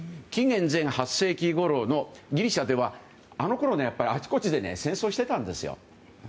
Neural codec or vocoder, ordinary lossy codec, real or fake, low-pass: none; none; real; none